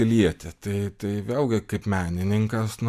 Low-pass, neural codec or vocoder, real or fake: 14.4 kHz; none; real